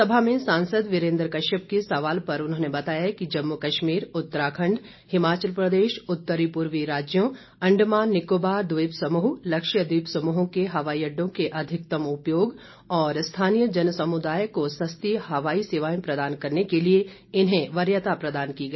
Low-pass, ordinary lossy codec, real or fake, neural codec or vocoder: 7.2 kHz; MP3, 24 kbps; real; none